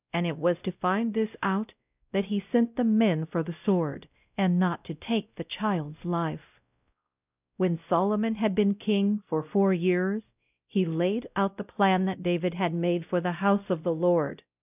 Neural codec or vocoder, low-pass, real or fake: codec, 16 kHz, 0.5 kbps, X-Codec, WavLM features, trained on Multilingual LibriSpeech; 3.6 kHz; fake